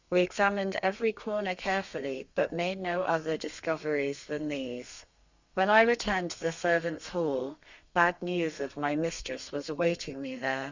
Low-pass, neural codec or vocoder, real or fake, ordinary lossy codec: 7.2 kHz; codec, 32 kHz, 1.9 kbps, SNAC; fake; Opus, 64 kbps